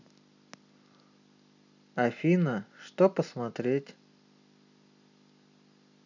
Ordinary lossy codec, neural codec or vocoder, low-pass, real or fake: none; none; 7.2 kHz; real